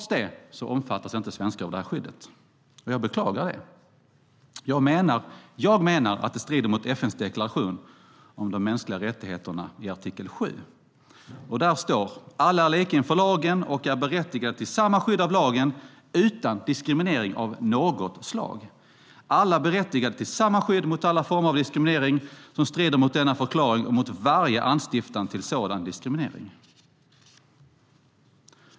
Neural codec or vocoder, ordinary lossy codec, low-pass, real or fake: none; none; none; real